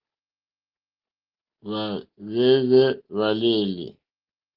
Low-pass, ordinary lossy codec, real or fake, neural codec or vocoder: 5.4 kHz; Opus, 16 kbps; real; none